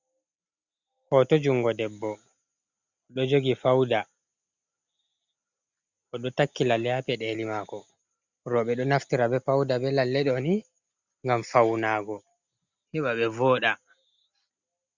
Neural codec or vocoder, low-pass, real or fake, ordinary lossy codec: none; 7.2 kHz; real; Opus, 64 kbps